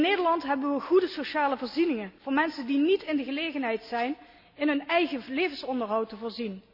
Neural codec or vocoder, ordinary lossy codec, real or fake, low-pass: none; none; real; 5.4 kHz